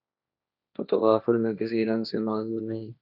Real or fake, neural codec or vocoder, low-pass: fake; codec, 16 kHz, 1.1 kbps, Voila-Tokenizer; 5.4 kHz